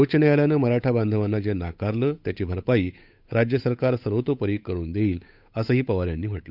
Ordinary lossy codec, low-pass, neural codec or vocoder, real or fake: none; 5.4 kHz; codec, 16 kHz, 8 kbps, FunCodec, trained on Chinese and English, 25 frames a second; fake